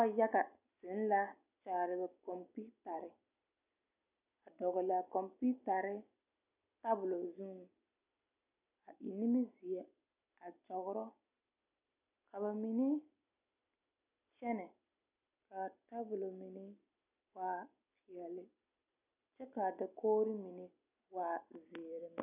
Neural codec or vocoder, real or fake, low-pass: none; real; 3.6 kHz